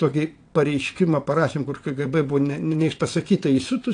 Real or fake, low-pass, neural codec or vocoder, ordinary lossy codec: fake; 9.9 kHz; vocoder, 22.05 kHz, 80 mel bands, WaveNeXt; AAC, 48 kbps